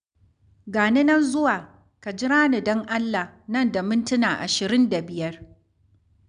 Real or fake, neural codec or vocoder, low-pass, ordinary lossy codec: real; none; 9.9 kHz; none